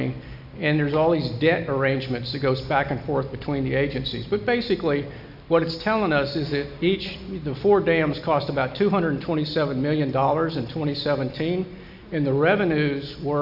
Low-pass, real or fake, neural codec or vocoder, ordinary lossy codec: 5.4 kHz; real; none; AAC, 48 kbps